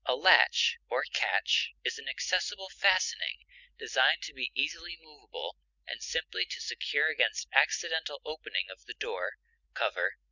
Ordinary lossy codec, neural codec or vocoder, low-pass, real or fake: Opus, 64 kbps; none; 7.2 kHz; real